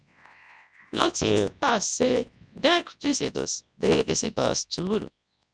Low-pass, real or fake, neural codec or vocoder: 9.9 kHz; fake; codec, 24 kHz, 0.9 kbps, WavTokenizer, large speech release